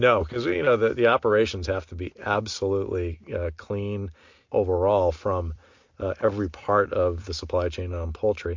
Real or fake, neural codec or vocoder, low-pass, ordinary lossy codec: real; none; 7.2 kHz; MP3, 48 kbps